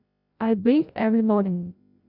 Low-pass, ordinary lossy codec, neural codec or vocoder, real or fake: 5.4 kHz; none; codec, 16 kHz, 0.5 kbps, FreqCodec, larger model; fake